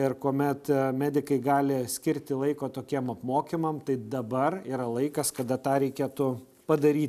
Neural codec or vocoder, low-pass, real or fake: none; 14.4 kHz; real